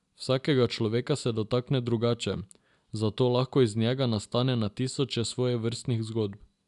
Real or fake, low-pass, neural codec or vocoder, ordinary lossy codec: real; 10.8 kHz; none; none